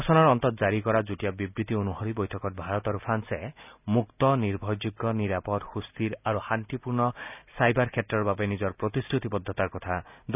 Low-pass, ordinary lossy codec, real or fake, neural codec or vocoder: 3.6 kHz; none; real; none